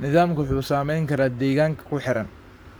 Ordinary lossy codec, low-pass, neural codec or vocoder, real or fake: none; none; codec, 44.1 kHz, 7.8 kbps, Pupu-Codec; fake